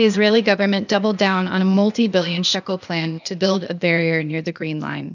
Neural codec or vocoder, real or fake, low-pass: codec, 16 kHz, 0.8 kbps, ZipCodec; fake; 7.2 kHz